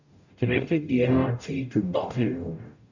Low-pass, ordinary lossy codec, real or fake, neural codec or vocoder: 7.2 kHz; none; fake; codec, 44.1 kHz, 0.9 kbps, DAC